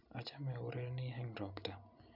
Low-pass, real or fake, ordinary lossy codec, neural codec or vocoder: 5.4 kHz; real; none; none